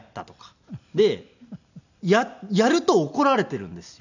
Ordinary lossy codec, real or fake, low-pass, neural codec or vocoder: none; real; 7.2 kHz; none